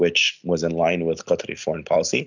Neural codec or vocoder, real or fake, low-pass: none; real; 7.2 kHz